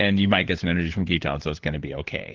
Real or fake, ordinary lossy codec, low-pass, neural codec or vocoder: fake; Opus, 16 kbps; 7.2 kHz; codec, 16 kHz, 4 kbps, FunCodec, trained on LibriTTS, 50 frames a second